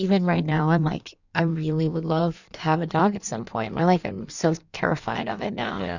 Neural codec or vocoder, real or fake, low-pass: codec, 16 kHz in and 24 kHz out, 1.1 kbps, FireRedTTS-2 codec; fake; 7.2 kHz